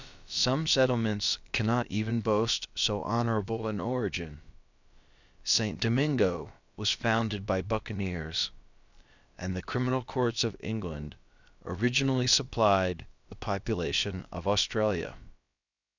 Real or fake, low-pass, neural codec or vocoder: fake; 7.2 kHz; codec, 16 kHz, about 1 kbps, DyCAST, with the encoder's durations